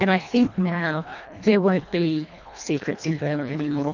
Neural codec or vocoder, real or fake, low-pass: codec, 24 kHz, 1.5 kbps, HILCodec; fake; 7.2 kHz